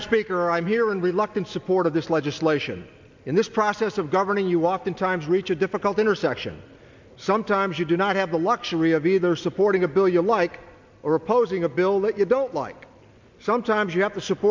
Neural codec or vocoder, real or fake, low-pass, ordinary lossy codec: none; real; 7.2 kHz; MP3, 64 kbps